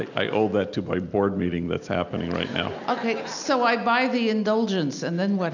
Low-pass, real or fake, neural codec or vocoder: 7.2 kHz; real; none